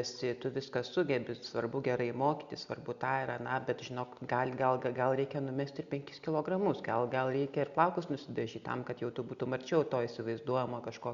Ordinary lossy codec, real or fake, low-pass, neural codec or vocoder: Opus, 64 kbps; real; 7.2 kHz; none